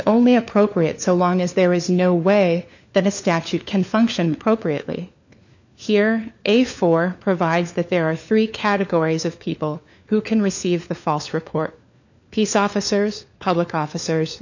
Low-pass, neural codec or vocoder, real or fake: 7.2 kHz; codec, 16 kHz, 4 kbps, FunCodec, trained on LibriTTS, 50 frames a second; fake